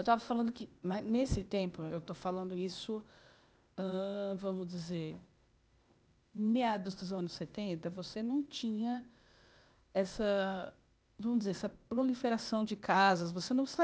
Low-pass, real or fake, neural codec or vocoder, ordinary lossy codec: none; fake; codec, 16 kHz, 0.8 kbps, ZipCodec; none